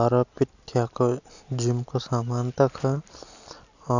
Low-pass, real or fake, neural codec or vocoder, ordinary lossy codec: 7.2 kHz; real; none; none